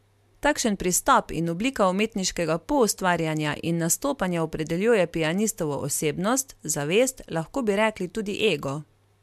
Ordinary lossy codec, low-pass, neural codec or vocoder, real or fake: MP3, 96 kbps; 14.4 kHz; none; real